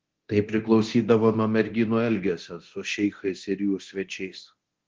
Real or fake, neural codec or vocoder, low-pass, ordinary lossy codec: fake; codec, 24 kHz, 0.9 kbps, DualCodec; 7.2 kHz; Opus, 16 kbps